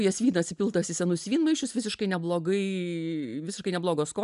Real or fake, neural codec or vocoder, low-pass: real; none; 10.8 kHz